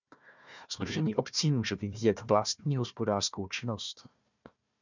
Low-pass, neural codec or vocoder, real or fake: 7.2 kHz; codec, 16 kHz, 1 kbps, FunCodec, trained on Chinese and English, 50 frames a second; fake